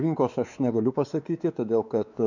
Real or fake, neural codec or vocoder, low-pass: fake; codec, 16 kHz, 4 kbps, X-Codec, WavLM features, trained on Multilingual LibriSpeech; 7.2 kHz